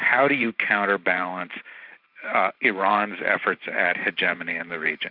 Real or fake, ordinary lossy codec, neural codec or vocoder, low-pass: fake; AAC, 48 kbps; vocoder, 44.1 kHz, 128 mel bands every 512 samples, BigVGAN v2; 5.4 kHz